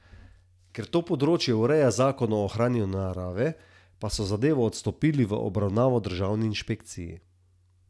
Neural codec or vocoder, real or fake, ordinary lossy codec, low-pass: none; real; none; none